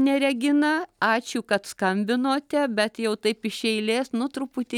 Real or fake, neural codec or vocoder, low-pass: real; none; 19.8 kHz